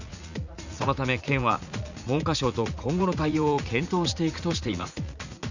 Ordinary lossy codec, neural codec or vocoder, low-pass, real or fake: none; vocoder, 44.1 kHz, 80 mel bands, Vocos; 7.2 kHz; fake